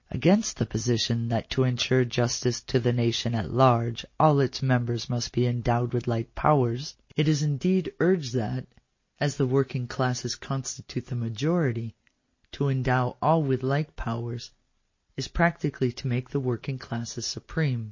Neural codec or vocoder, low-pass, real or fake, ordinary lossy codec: none; 7.2 kHz; real; MP3, 32 kbps